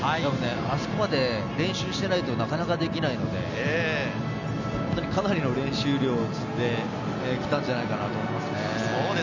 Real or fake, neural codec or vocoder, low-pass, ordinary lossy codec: real; none; 7.2 kHz; none